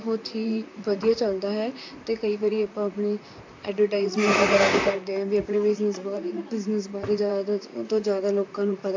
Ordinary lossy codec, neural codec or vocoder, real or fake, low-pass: AAC, 48 kbps; vocoder, 44.1 kHz, 128 mel bands, Pupu-Vocoder; fake; 7.2 kHz